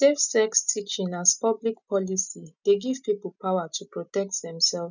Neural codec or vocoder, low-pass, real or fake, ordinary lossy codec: none; 7.2 kHz; real; none